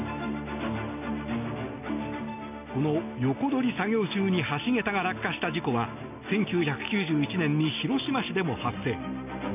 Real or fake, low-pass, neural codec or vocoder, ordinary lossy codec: real; 3.6 kHz; none; none